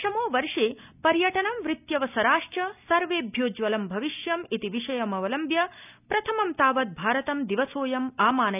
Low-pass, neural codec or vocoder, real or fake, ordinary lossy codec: 3.6 kHz; none; real; none